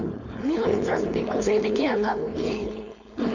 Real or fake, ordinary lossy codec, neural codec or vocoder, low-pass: fake; none; codec, 16 kHz, 4.8 kbps, FACodec; 7.2 kHz